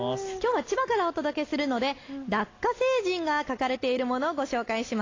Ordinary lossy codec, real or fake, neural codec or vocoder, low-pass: AAC, 32 kbps; real; none; 7.2 kHz